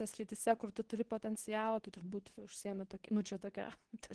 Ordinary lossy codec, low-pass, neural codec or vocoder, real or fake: Opus, 16 kbps; 10.8 kHz; codec, 24 kHz, 0.9 kbps, WavTokenizer, medium speech release version 2; fake